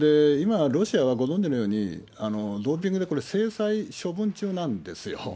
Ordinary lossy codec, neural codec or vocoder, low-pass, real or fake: none; none; none; real